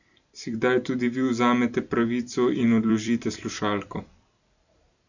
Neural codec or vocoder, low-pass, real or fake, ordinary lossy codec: vocoder, 44.1 kHz, 128 mel bands every 256 samples, BigVGAN v2; 7.2 kHz; fake; none